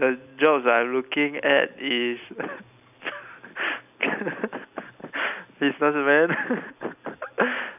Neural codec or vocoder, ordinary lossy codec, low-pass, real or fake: none; none; 3.6 kHz; real